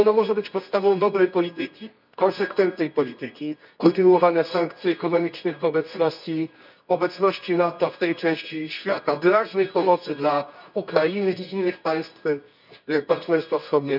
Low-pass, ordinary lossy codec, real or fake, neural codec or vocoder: 5.4 kHz; none; fake; codec, 24 kHz, 0.9 kbps, WavTokenizer, medium music audio release